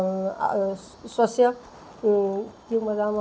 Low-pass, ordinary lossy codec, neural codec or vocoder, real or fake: none; none; none; real